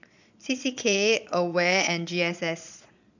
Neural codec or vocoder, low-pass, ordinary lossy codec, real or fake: vocoder, 22.05 kHz, 80 mel bands, Vocos; 7.2 kHz; none; fake